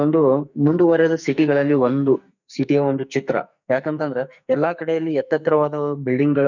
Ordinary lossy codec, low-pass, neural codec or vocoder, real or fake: none; 7.2 kHz; codec, 44.1 kHz, 2.6 kbps, SNAC; fake